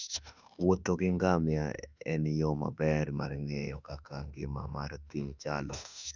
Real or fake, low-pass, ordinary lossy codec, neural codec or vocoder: fake; 7.2 kHz; none; autoencoder, 48 kHz, 32 numbers a frame, DAC-VAE, trained on Japanese speech